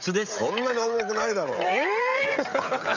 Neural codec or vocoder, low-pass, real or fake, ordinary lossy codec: codec, 16 kHz, 16 kbps, FreqCodec, smaller model; 7.2 kHz; fake; none